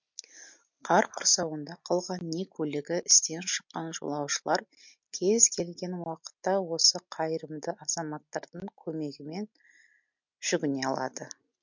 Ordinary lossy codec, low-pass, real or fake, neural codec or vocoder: MP3, 48 kbps; 7.2 kHz; real; none